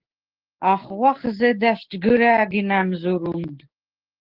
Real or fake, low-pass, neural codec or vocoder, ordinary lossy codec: fake; 5.4 kHz; codec, 16 kHz, 6 kbps, DAC; Opus, 16 kbps